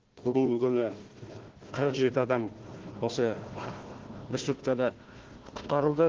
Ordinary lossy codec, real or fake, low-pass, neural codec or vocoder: Opus, 16 kbps; fake; 7.2 kHz; codec, 16 kHz, 1 kbps, FunCodec, trained on Chinese and English, 50 frames a second